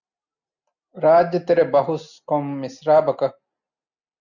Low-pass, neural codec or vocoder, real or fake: 7.2 kHz; none; real